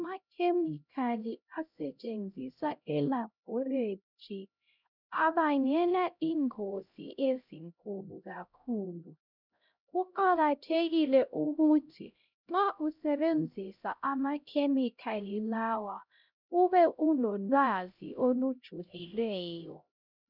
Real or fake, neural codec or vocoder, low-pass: fake; codec, 16 kHz, 0.5 kbps, X-Codec, HuBERT features, trained on LibriSpeech; 5.4 kHz